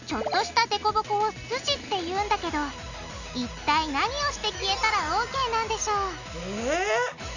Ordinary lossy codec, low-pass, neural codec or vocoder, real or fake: none; 7.2 kHz; none; real